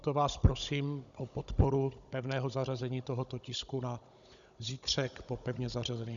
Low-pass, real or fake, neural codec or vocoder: 7.2 kHz; fake; codec, 16 kHz, 16 kbps, FunCodec, trained on Chinese and English, 50 frames a second